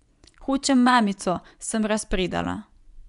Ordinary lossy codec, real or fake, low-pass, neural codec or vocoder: none; fake; 10.8 kHz; vocoder, 24 kHz, 100 mel bands, Vocos